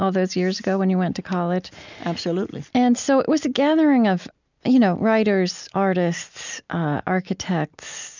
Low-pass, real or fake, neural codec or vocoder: 7.2 kHz; real; none